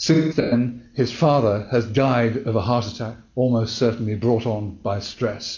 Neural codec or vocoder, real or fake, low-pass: none; real; 7.2 kHz